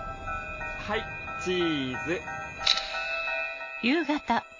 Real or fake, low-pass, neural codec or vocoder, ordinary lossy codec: real; 7.2 kHz; none; MP3, 32 kbps